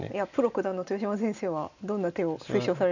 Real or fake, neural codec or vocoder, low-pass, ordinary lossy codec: real; none; 7.2 kHz; none